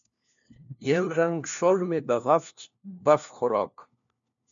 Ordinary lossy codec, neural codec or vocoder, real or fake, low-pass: MP3, 64 kbps; codec, 16 kHz, 1 kbps, FunCodec, trained on LibriTTS, 50 frames a second; fake; 7.2 kHz